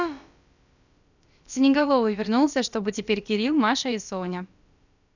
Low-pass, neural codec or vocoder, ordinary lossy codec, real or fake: 7.2 kHz; codec, 16 kHz, about 1 kbps, DyCAST, with the encoder's durations; none; fake